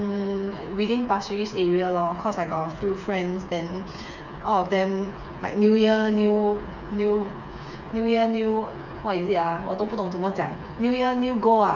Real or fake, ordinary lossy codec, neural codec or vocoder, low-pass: fake; none; codec, 16 kHz, 4 kbps, FreqCodec, smaller model; 7.2 kHz